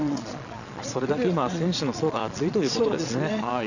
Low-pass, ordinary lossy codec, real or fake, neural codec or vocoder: 7.2 kHz; none; fake; vocoder, 22.05 kHz, 80 mel bands, Vocos